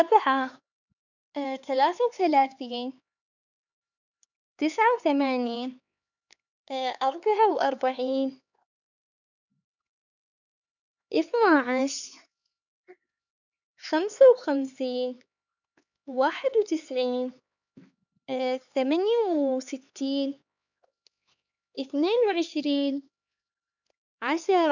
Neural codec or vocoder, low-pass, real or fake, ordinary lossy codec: codec, 16 kHz, 4 kbps, X-Codec, HuBERT features, trained on LibriSpeech; 7.2 kHz; fake; none